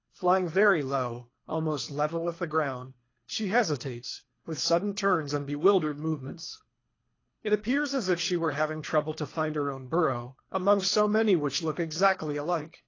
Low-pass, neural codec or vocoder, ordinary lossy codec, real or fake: 7.2 kHz; codec, 24 kHz, 3 kbps, HILCodec; AAC, 32 kbps; fake